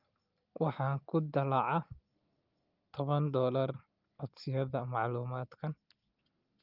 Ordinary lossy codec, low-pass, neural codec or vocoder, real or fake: Opus, 24 kbps; 5.4 kHz; none; real